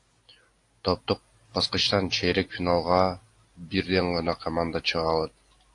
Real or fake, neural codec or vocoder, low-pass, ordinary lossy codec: real; none; 10.8 kHz; AAC, 48 kbps